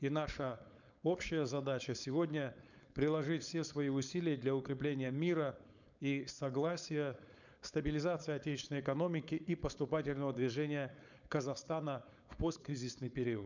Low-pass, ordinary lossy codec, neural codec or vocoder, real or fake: 7.2 kHz; none; codec, 16 kHz, 4.8 kbps, FACodec; fake